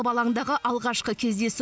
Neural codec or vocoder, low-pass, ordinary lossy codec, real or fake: none; none; none; real